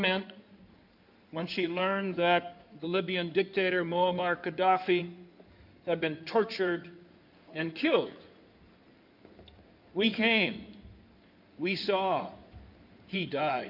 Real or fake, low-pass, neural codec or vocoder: fake; 5.4 kHz; codec, 16 kHz in and 24 kHz out, 2.2 kbps, FireRedTTS-2 codec